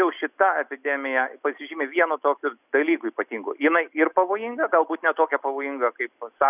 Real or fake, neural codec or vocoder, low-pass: real; none; 3.6 kHz